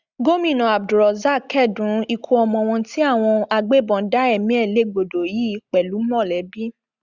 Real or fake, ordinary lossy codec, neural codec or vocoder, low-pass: real; Opus, 64 kbps; none; 7.2 kHz